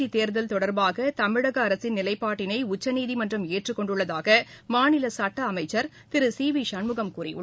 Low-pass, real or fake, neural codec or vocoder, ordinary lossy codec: none; real; none; none